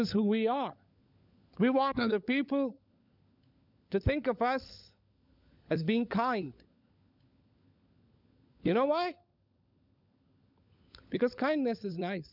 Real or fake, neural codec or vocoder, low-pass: fake; codec, 16 kHz, 16 kbps, FunCodec, trained on LibriTTS, 50 frames a second; 5.4 kHz